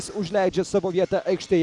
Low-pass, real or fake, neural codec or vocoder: 10.8 kHz; real; none